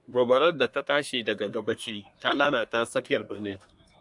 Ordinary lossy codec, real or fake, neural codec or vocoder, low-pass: AAC, 64 kbps; fake; codec, 24 kHz, 1 kbps, SNAC; 10.8 kHz